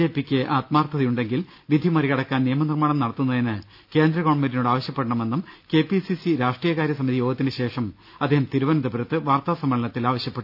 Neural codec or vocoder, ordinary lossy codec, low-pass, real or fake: none; none; 5.4 kHz; real